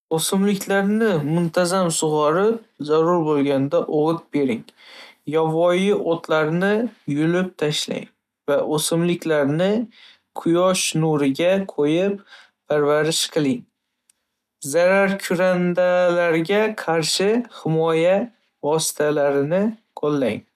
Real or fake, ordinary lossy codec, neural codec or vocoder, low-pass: real; none; none; 14.4 kHz